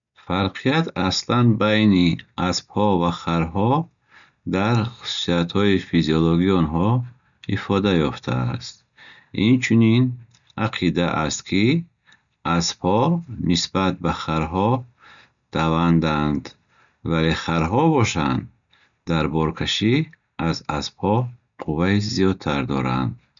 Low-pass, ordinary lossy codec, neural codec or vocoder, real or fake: 7.2 kHz; none; none; real